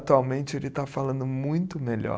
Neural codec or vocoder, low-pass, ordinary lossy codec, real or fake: none; none; none; real